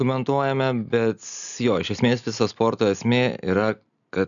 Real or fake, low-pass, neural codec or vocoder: real; 7.2 kHz; none